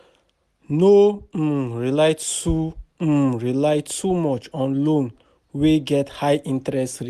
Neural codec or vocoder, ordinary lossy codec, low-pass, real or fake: none; Opus, 64 kbps; 14.4 kHz; real